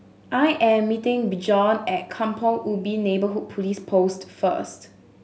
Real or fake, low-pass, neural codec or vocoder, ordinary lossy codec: real; none; none; none